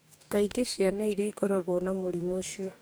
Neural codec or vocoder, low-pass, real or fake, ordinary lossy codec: codec, 44.1 kHz, 2.6 kbps, DAC; none; fake; none